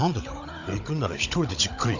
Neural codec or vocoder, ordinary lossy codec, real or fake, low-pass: codec, 16 kHz, 16 kbps, FunCodec, trained on Chinese and English, 50 frames a second; none; fake; 7.2 kHz